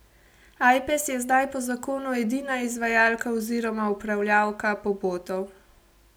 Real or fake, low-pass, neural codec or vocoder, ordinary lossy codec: real; none; none; none